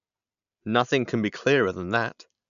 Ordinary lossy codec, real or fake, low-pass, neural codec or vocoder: none; real; 7.2 kHz; none